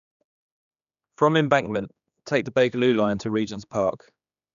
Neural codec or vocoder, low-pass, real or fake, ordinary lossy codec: codec, 16 kHz, 2 kbps, X-Codec, HuBERT features, trained on general audio; 7.2 kHz; fake; none